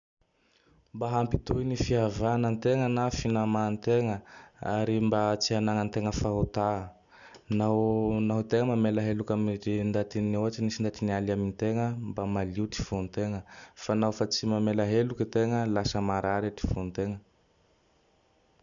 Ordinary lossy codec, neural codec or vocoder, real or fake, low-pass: none; none; real; 7.2 kHz